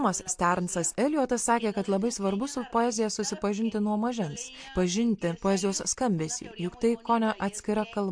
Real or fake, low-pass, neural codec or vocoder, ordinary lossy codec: real; 9.9 kHz; none; MP3, 64 kbps